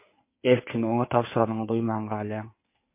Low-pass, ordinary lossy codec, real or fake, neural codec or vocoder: 3.6 kHz; MP3, 24 kbps; fake; codec, 16 kHz in and 24 kHz out, 2.2 kbps, FireRedTTS-2 codec